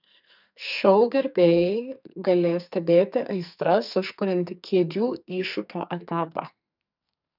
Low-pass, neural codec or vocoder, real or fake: 5.4 kHz; codec, 44.1 kHz, 2.6 kbps, SNAC; fake